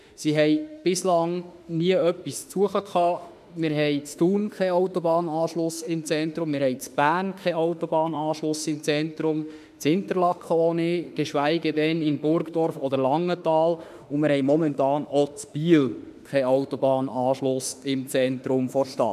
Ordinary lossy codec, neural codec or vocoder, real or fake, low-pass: none; autoencoder, 48 kHz, 32 numbers a frame, DAC-VAE, trained on Japanese speech; fake; 14.4 kHz